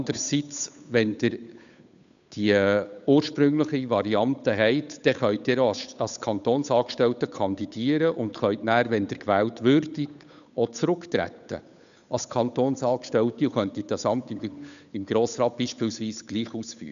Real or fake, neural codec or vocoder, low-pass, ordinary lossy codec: fake; codec, 16 kHz, 8 kbps, FunCodec, trained on Chinese and English, 25 frames a second; 7.2 kHz; none